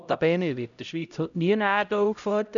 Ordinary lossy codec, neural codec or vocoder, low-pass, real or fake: none; codec, 16 kHz, 0.5 kbps, X-Codec, HuBERT features, trained on LibriSpeech; 7.2 kHz; fake